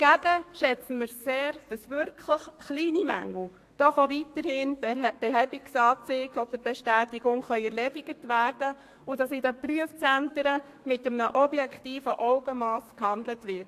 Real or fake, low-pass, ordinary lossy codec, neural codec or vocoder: fake; 14.4 kHz; AAC, 64 kbps; codec, 32 kHz, 1.9 kbps, SNAC